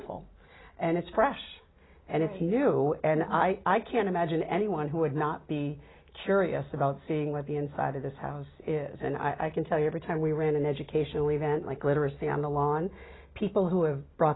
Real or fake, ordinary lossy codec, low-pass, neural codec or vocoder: real; AAC, 16 kbps; 7.2 kHz; none